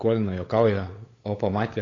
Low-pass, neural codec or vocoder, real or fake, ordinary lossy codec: 7.2 kHz; codec, 16 kHz, 8 kbps, FunCodec, trained on LibriTTS, 25 frames a second; fake; AAC, 32 kbps